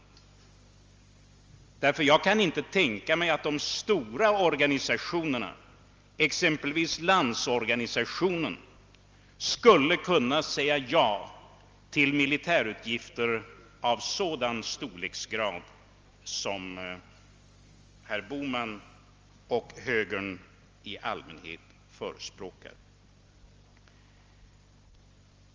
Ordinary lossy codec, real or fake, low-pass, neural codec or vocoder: Opus, 32 kbps; real; 7.2 kHz; none